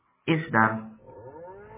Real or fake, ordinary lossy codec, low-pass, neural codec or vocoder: real; MP3, 16 kbps; 3.6 kHz; none